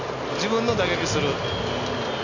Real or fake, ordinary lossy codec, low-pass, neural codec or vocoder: real; none; 7.2 kHz; none